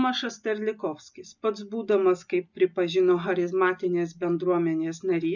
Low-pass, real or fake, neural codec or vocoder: 7.2 kHz; real; none